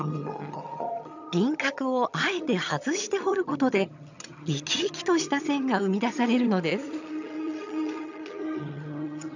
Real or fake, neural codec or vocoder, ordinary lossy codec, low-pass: fake; vocoder, 22.05 kHz, 80 mel bands, HiFi-GAN; none; 7.2 kHz